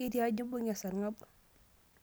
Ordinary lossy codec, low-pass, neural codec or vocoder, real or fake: none; none; none; real